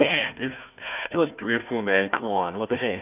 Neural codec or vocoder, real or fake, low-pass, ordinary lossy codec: codec, 16 kHz, 1 kbps, FunCodec, trained on Chinese and English, 50 frames a second; fake; 3.6 kHz; none